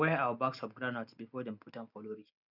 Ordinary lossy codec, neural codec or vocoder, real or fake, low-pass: none; none; real; 5.4 kHz